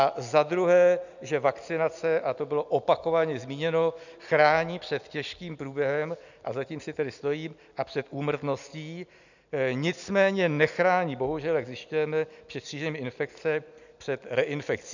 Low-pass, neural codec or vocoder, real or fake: 7.2 kHz; none; real